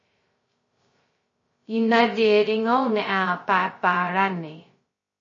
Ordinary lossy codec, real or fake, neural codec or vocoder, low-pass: MP3, 32 kbps; fake; codec, 16 kHz, 0.2 kbps, FocalCodec; 7.2 kHz